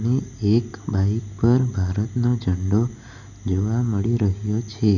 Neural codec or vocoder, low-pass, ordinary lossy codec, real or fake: none; 7.2 kHz; none; real